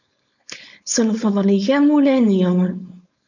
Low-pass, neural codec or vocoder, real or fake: 7.2 kHz; codec, 16 kHz, 4.8 kbps, FACodec; fake